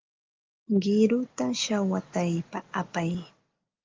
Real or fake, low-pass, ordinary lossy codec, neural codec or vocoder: real; 7.2 kHz; Opus, 32 kbps; none